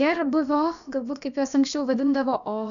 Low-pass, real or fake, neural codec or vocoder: 7.2 kHz; fake; codec, 16 kHz, about 1 kbps, DyCAST, with the encoder's durations